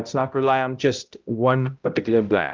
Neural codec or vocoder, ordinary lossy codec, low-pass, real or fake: codec, 16 kHz, 0.5 kbps, X-Codec, HuBERT features, trained on balanced general audio; Opus, 24 kbps; 7.2 kHz; fake